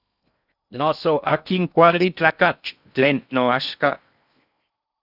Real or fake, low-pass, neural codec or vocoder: fake; 5.4 kHz; codec, 16 kHz in and 24 kHz out, 0.6 kbps, FocalCodec, streaming, 2048 codes